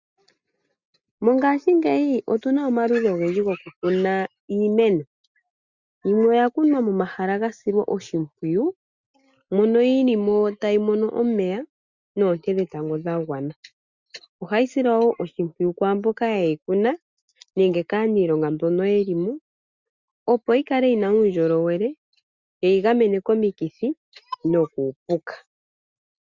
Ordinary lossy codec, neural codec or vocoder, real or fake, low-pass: Opus, 64 kbps; none; real; 7.2 kHz